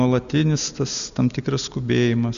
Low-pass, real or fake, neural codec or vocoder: 7.2 kHz; real; none